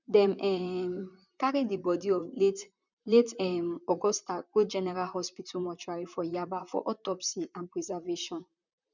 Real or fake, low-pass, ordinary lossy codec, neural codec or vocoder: fake; 7.2 kHz; none; vocoder, 44.1 kHz, 128 mel bands, Pupu-Vocoder